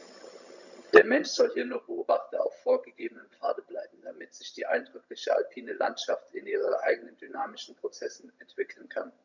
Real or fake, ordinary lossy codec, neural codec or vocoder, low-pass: fake; none; vocoder, 22.05 kHz, 80 mel bands, HiFi-GAN; 7.2 kHz